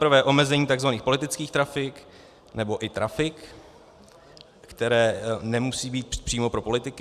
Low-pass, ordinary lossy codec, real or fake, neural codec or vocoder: 14.4 kHz; Opus, 64 kbps; real; none